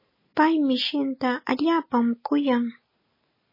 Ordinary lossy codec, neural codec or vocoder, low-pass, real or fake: MP3, 24 kbps; none; 5.4 kHz; real